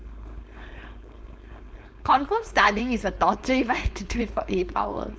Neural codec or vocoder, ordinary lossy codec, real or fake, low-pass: codec, 16 kHz, 4.8 kbps, FACodec; none; fake; none